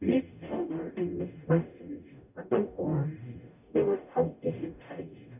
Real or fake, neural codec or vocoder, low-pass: fake; codec, 44.1 kHz, 0.9 kbps, DAC; 3.6 kHz